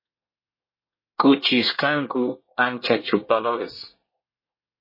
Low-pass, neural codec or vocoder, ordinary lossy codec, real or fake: 5.4 kHz; codec, 24 kHz, 1 kbps, SNAC; MP3, 24 kbps; fake